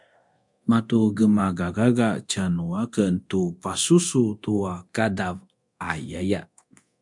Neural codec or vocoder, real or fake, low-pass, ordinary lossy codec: codec, 24 kHz, 0.9 kbps, DualCodec; fake; 10.8 kHz; MP3, 64 kbps